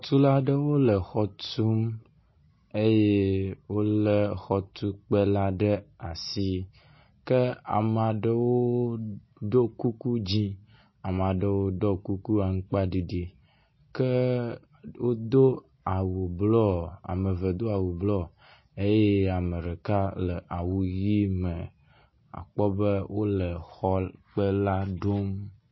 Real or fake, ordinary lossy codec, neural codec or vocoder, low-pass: real; MP3, 24 kbps; none; 7.2 kHz